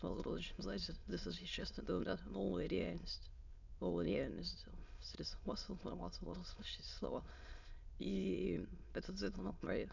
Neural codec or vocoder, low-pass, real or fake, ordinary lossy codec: autoencoder, 22.05 kHz, a latent of 192 numbers a frame, VITS, trained on many speakers; 7.2 kHz; fake; none